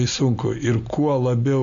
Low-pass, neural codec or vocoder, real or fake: 7.2 kHz; none; real